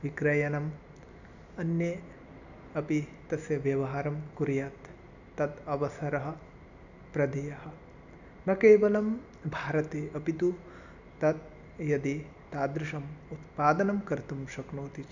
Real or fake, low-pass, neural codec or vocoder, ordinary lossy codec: real; 7.2 kHz; none; none